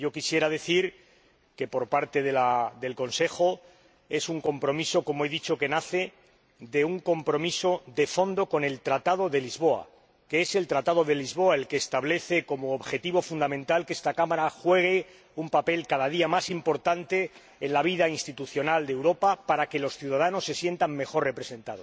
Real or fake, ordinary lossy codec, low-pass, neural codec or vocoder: real; none; none; none